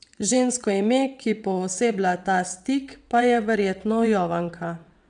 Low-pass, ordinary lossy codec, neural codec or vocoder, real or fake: 9.9 kHz; none; vocoder, 22.05 kHz, 80 mel bands, WaveNeXt; fake